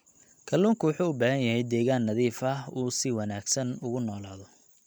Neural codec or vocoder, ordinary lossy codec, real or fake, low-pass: none; none; real; none